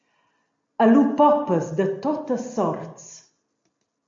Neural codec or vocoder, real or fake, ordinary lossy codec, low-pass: none; real; MP3, 48 kbps; 7.2 kHz